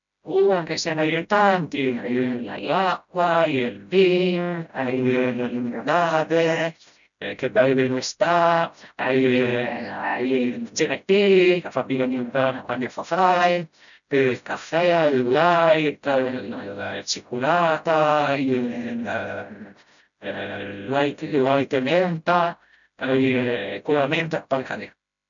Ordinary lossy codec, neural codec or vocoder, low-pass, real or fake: none; codec, 16 kHz, 0.5 kbps, FreqCodec, smaller model; 7.2 kHz; fake